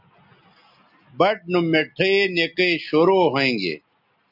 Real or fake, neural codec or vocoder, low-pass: real; none; 5.4 kHz